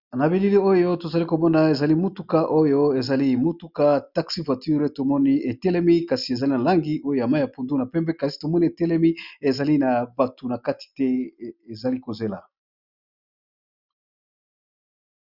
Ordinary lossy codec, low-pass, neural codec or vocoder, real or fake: Opus, 64 kbps; 5.4 kHz; none; real